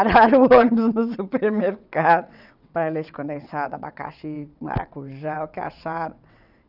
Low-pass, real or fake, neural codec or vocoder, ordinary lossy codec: 5.4 kHz; fake; vocoder, 22.05 kHz, 80 mel bands, WaveNeXt; none